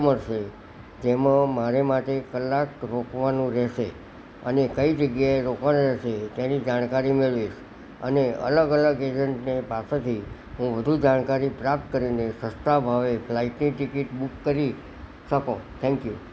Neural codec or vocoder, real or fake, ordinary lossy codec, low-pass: none; real; none; none